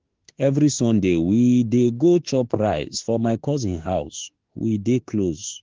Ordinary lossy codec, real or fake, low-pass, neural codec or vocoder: Opus, 16 kbps; fake; 7.2 kHz; autoencoder, 48 kHz, 32 numbers a frame, DAC-VAE, trained on Japanese speech